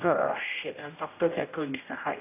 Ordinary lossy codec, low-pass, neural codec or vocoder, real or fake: AAC, 24 kbps; 3.6 kHz; codec, 16 kHz, 0.5 kbps, X-Codec, HuBERT features, trained on general audio; fake